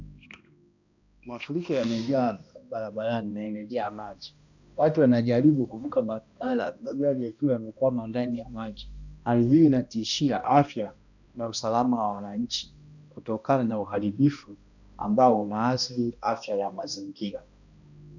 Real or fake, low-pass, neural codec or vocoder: fake; 7.2 kHz; codec, 16 kHz, 1 kbps, X-Codec, HuBERT features, trained on balanced general audio